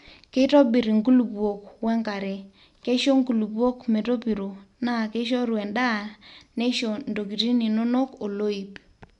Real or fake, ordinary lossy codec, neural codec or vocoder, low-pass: real; none; none; 10.8 kHz